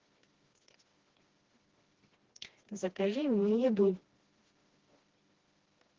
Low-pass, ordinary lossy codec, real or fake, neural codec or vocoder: 7.2 kHz; Opus, 16 kbps; fake; codec, 16 kHz, 1 kbps, FreqCodec, smaller model